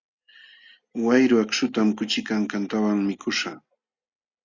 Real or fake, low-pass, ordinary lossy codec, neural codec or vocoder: real; 7.2 kHz; Opus, 64 kbps; none